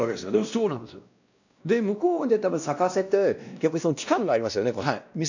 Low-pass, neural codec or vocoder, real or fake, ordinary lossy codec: 7.2 kHz; codec, 16 kHz, 1 kbps, X-Codec, WavLM features, trained on Multilingual LibriSpeech; fake; none